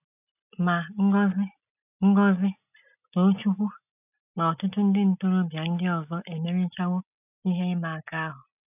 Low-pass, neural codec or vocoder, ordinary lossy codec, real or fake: 3.6 kHz; none; none; real